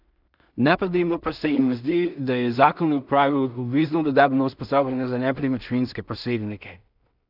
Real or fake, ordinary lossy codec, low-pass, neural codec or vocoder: fake; none; 5.4 kHz; codec, 16 kHz in and 24 kHz out, 0.4 kbps, LongCat-Audio-Codec, two codebook decoder